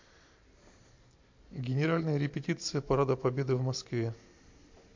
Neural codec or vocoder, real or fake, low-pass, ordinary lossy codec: none; real; 7.2 kHz; MP3, 48 kbps